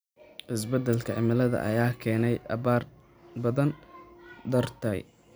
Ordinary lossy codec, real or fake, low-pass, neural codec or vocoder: none; real; none; none